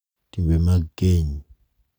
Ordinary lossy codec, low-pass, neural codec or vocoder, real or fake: none; none; none; real